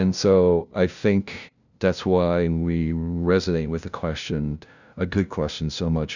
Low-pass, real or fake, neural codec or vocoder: 7.2 kHz; fake; codec, 16 kHz, 0.5 kbps, FunCodec, trained on LibriTTS, 25 frames a second